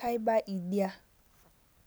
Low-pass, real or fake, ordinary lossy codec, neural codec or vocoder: none; real; none; none